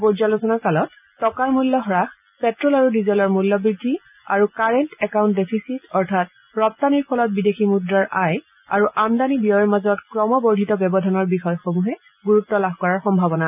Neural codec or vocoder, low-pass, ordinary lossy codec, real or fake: none; 3.6 kHz; none; real